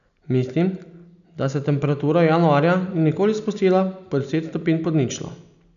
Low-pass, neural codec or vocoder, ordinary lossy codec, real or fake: 7.2 kHz; none; none; real